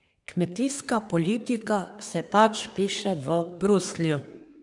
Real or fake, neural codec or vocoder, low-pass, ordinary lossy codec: fake; codec, 24 kHz, 1 kbps, SNAC; 10.8 kHz; none